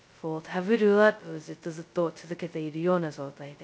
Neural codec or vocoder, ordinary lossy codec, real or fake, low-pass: codec, 16 kHz, 0.2 kbps, FocalCodec; none; fake; none